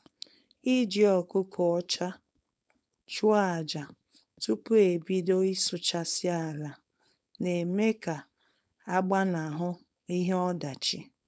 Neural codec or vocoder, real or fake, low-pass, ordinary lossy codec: codec, 16 kHz, 4.8 kbps, FACodec; fake; none; none